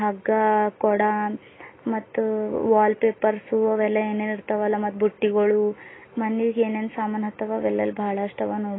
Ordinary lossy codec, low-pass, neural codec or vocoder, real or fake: AAC, 16 kbps; 7.2 kHz; none; real